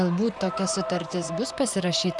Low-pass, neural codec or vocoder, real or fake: 10.8 kHz; vocoder, 44.1 kHz, 128 mel bands, Pupu-Vocoder; fake